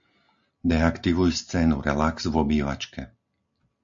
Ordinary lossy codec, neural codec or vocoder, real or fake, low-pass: MP3, 48 kbps; none; real; 7.2 kHz